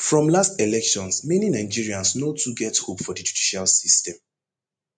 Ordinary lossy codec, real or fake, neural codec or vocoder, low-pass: MP3, 48 kbps; real; none; 9.9 kHz